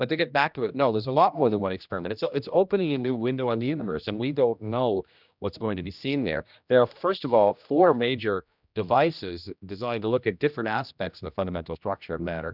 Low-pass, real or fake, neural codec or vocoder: 5.4 kHz; fake; codec, 16 kHz, 1 kbps, X-Codec, HuBERT features, trained on general audio